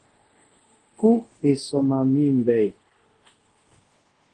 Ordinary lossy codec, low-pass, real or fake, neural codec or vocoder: Opus, 16 kbps; 10.8 kHz; fake; codec, 24 kHz, 0.5 kbps, DualCodec